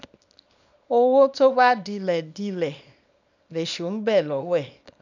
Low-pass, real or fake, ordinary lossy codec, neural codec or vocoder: 7.2 kHz; fake; none; codec, 24 kHz, 0.9 kbps, WavTokenizer, small release